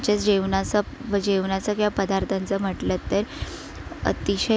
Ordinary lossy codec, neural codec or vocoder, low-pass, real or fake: none; none; none; real